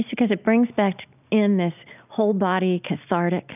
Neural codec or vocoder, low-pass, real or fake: none; 3.6 kHz; real